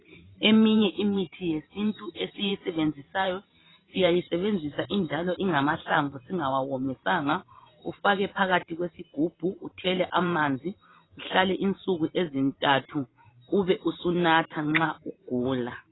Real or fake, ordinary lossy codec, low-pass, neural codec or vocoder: fake; AAC, 16 kbps; 7.2 kHz; vocoder, 44.1 kHz, 128 mel bands every 512 samples, BigVGAN v2